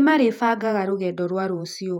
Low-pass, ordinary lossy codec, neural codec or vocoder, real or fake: 19.8 kHz; none; vocoder, 48 kHz, 128 mel bands, Vocos; fake